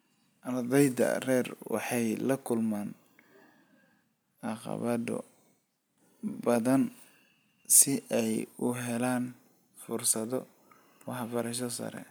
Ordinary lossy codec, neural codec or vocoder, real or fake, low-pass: none; none; real; none